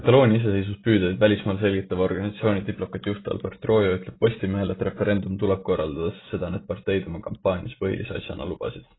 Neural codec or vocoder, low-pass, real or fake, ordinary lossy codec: none; 7.2 kHz; real; AAC, 16 kbps